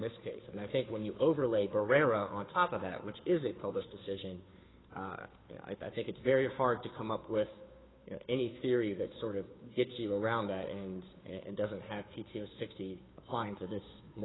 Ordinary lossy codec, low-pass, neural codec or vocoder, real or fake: AAC, 16 kbps; 7.2 kHz; codec, 16 kHz, 2 kbps, FunCodec, trained on Chinese and English, 25 frames a second; fake